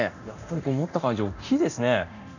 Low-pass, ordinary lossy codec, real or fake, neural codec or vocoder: 7.2 kHz; none; fake; codec, 24 kHz, 0.9 kbps, DualCodec